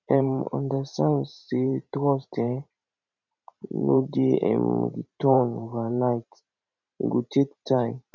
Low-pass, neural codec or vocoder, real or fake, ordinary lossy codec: 7.2 kHz; vocoder, 44.1 kHz, 128 mel bands every 512 samples, BigVGAN v2; fake; none